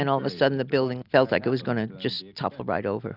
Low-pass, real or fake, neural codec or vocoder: 5.4 kHz; real; none